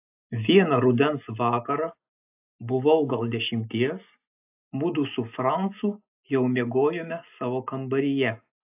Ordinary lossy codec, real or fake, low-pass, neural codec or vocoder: AAC, 32 kbps; real; 3.6 kHz; none